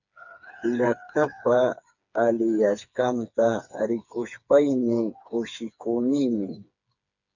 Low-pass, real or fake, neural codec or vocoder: 7.2 kHz; fake; codec, 16 kHz, 4 kbps, FreqCodec, smaller model